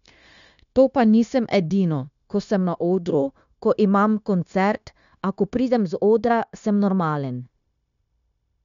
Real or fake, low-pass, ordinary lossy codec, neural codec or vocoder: fake; 7.2 kHz; none; codec, 16 kHz, 0.9 kbps, LongCat-Audio-Codec